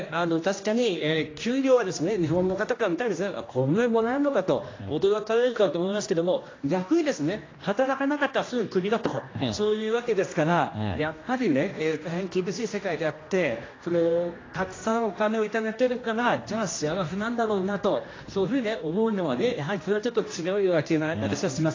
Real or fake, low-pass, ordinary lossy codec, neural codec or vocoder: fake; 7.2 kHz; AAC, 32 kbps; codec, 16 kHz, 1 kbps, X-Codec, HuBERT features, trained on general audio